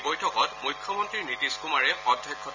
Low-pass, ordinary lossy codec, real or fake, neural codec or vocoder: 7.2 kHz; MP3, 64 kbps; real; none